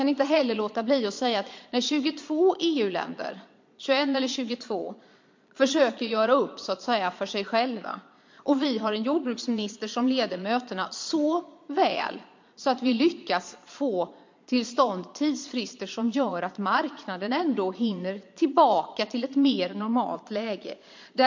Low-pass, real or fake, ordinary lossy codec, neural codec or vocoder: 7.2 kHz; fake; MP3, 48 kbps; vocoder, 22.05 kHz, 80 mel bands, Vocos